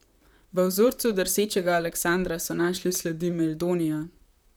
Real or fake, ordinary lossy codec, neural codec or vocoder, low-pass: fake; none; vocoder, 44.1 kHz, 128 mel bands, Pupu-Vocoder; none